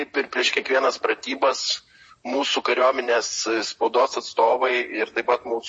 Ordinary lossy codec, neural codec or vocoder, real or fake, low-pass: MP3, 32 kbps; vocoder, 44.1 kHz, 128 mel bands, Pupu-Vocoder; fake; 9.9 kHz